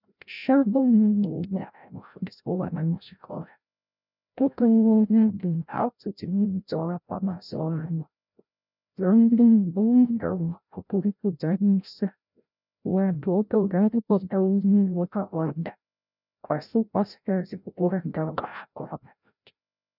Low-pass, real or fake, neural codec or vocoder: 5.4 kHz; fake; codec, 16 kHz, 0.5 kbps, FreqCodec, larger model